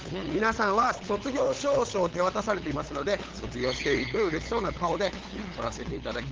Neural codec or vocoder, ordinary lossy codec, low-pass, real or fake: codec, 16 kHz, 8 kbps, FunCodec, trained on LibriTTS, 25 frames a second; Opus, 16 kbps; 7.2 kHz; fake